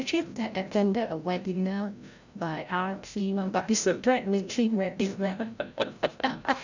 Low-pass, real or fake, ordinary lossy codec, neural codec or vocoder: 7.2 kHz; fake; none; codec, 16 kHz, 0.5 kbps, FreqCodec, larger model